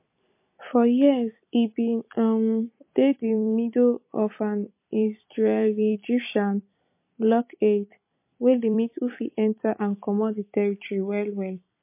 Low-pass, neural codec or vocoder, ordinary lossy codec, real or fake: 3.6 kHz; vocoder, 44.1 kHz, 128 mel bands every 256 samples, BigVGAN v2; MP3, 24 kbps; fake